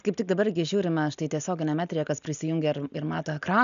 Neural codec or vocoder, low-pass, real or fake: none; 7.2 kHz; real